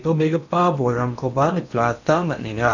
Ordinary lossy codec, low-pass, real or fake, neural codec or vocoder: none; 7.2 kHz; fake; codec, 16 kHz in and 24 kHz out, 0.8 kbps, FocalCodec, streaming, 65536 codes